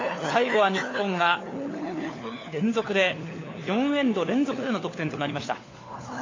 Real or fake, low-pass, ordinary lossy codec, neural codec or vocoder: fake; 7.2 kHz; AAC, 32 kbps; codec, 16 kHz, 4 kbps, FunCodec, trained on LibriTTS, 50 frames a second